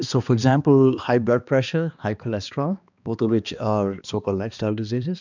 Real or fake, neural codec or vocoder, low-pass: fake; codec, 16 kHz, 2 kbps, X-Codec, HuBERT features, trained on balanced general audio; 7.2 kHz